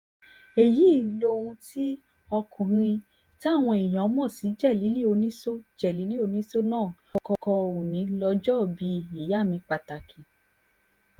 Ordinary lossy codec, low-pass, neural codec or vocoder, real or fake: Opus, 32 kbps; 19.8 kHz; vocoder, 48 kHz, 128 mel bands, Vocos; fake